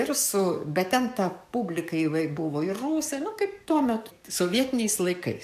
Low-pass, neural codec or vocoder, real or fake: 14.4 kHz; codec, 44.1 kHz, 7.8 kbps, DAC; fake